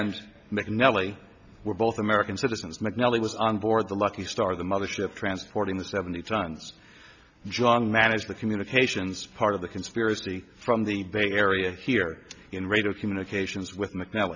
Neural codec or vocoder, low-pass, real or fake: none; 7.2 kHz; real